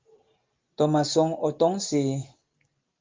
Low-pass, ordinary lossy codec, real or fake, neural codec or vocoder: 7.2 kHz; Opus, 32 kbps; real; none